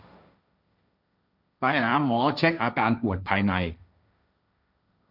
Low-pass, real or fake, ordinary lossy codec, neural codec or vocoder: 5.4 kHz; fake; none; codec, 16 kHz, 1.1 kbps, Voila-Tokenizer